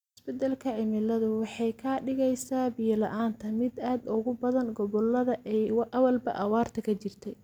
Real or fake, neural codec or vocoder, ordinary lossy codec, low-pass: real; none; none; 19.8 kHz